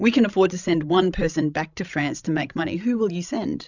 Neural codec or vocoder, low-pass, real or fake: codec, 16 kHz, 16 kbps, FreqCodec, larger model; 7.2 kHz; fake